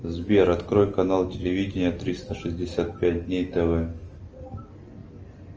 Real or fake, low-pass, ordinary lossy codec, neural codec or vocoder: real; 7.2 kHz; Opus, 24 kbps; none